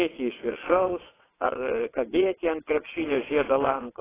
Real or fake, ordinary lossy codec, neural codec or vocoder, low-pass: fake; AAC, 16 kbps; vocoder, 22.05 kHz, 80 mel bands, WaveNeXt; 3.6 kHz